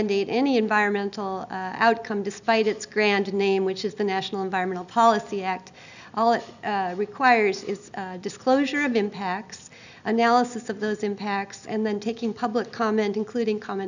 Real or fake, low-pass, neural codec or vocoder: real; 7.2 kHz; none